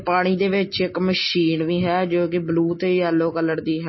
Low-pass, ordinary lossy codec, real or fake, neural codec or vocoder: 7.2 kHz; MP3, 24 kbps; real; none